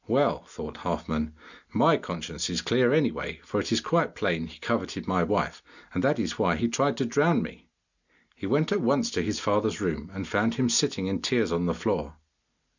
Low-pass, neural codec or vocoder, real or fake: 7.2 kHz; none; real